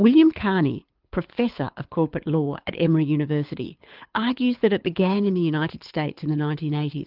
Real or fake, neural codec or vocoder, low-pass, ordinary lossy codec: fake; codec, 24 kHz, 6 kbps, HILCodec; 5.4 kHz; Opus, 32 kbps